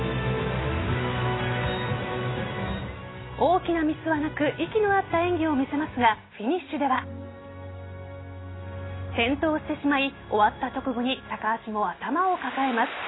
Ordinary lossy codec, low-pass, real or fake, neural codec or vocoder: AAC, 16 kbps; 7.2 kHz; real; none